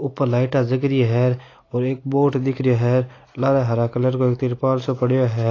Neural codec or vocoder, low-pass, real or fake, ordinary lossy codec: none; 7.2 kHz; real; AAC, 32 kbps